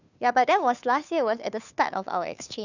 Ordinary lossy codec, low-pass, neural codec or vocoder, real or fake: none; 7.2 kHz; codec, 16 kHz, 2 kbps, FunCodec, trained on Chinese and English, 25 frames a second; fake